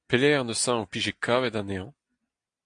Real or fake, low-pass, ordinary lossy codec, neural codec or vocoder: real; 9.9 kHz; AAC, 64 kbps; none